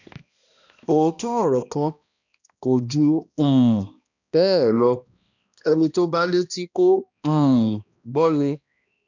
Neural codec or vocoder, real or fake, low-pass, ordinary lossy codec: codec, 16 kHz, 1 kbps, X-Codec, HuBERT features, trained on balanced general audio; fake; 7.2 kHz; none